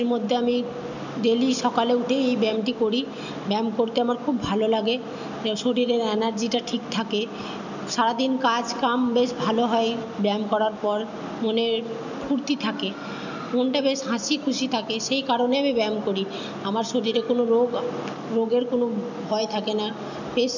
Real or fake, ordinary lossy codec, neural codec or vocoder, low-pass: real; none; none; 7.2 kHz